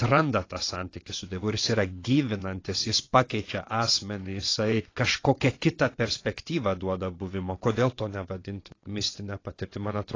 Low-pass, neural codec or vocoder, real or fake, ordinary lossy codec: 7.2 kHz; vocoder, 22.05 kHz, 80 mel bands, WaveNeXt; fake; AAC, 32 kbps